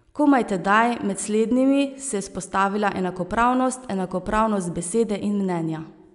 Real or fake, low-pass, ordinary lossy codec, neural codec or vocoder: real; 10.8 kHz; none; none